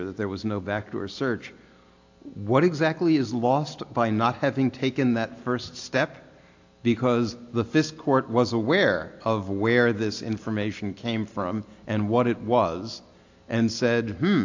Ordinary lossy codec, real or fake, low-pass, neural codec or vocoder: AAC, 48 kbps; real; 7.2 kHz; none